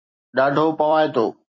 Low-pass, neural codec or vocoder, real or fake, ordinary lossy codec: 7.2 kHz; vocoder, 44.1 kHz, 128 mel bands every 256 samples, BigVGAN v2; fake; MP3, 32 kbps